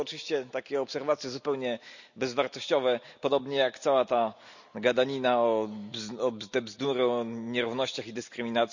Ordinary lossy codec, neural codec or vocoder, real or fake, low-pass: none; none; real; 7.2 kHz